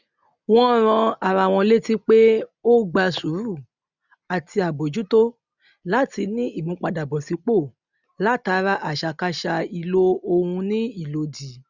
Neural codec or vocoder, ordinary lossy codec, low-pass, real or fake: none; none; 7.2 kHz; real